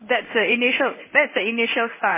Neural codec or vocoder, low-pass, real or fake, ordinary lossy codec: codec, 16 kHz in and 24 kHz out, 1 kbps, XY-Tokenizer; 3.6 kHz; fake; MP3, 16 kbps